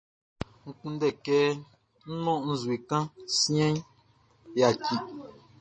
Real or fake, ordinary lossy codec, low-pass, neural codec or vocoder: real; MP3, 32 kbps; 9.9 kHz; none